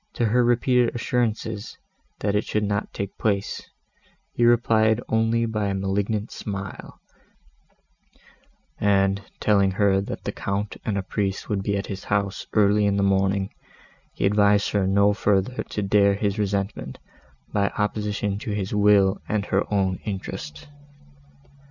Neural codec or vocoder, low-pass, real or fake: none; 7.2 kHz; real